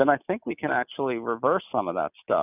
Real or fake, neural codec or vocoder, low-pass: real; none; 3.6 kHz